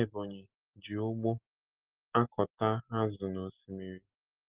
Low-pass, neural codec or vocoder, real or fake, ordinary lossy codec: 3.6 kHz; none; real; Opus, 16 kbps